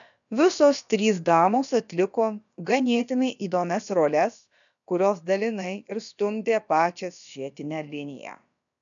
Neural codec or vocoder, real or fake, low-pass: codec, 16 kHz, about 1 kbps, DyCAST, with the encoder's durations; fake; 7.2 kHz